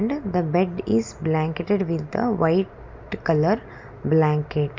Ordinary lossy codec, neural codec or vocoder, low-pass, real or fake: MP3, 64 kbps; none; 7.2 kHz; real